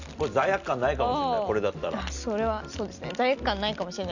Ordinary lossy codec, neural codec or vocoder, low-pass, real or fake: none; none; 7.2 kHz; real